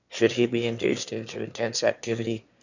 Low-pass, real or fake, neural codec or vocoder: 7.2 kHz; fake; autoencoder, 22.05 kHz, a latent of 192 numbers a frame, VITS, trained on one speaker